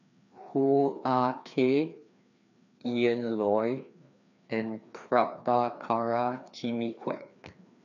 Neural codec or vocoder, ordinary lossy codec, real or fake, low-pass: codec, 16 kHz, 2 kbps, FreqCodec, larger model; none; fake; 7.2 kHz